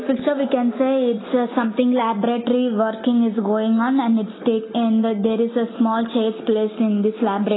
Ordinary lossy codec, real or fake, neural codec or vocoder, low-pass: AAC, 16 kbps; real; none; 7.2 kHz